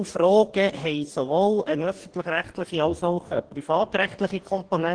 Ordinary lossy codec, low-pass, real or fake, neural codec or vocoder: Opus, 16 kbps; 9.9 kHz; fake; codec, 44.1 kHz, 2.6 kbps, DAC